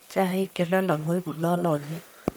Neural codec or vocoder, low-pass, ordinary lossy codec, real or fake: codec, 44.1 kHz, 1.7 kbps, Pupu-Codec; none; none; fake